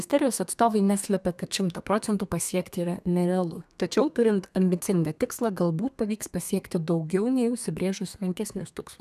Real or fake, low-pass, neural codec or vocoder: fake; 14.4 kHz; codec, 32 kHz, 1.9 kbps, SNAC